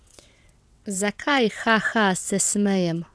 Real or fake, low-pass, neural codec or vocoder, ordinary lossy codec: fake; none; vocoder, 22.05 kHz, 80 mel bands, WaveNeXt; none